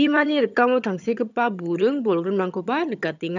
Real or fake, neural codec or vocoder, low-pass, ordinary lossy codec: fake; vocoder, 22.05 kHz, 80 mel bands, HiFi-GAN; 7.2 kHz; none